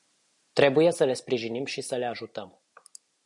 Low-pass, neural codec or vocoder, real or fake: 10.8 kHz; none; real